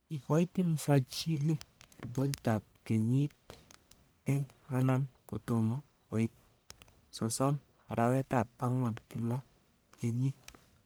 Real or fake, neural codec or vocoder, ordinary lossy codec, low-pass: fake; codec, 44.1 kHz, 1.7 kbps, Pupu-Codec; none; none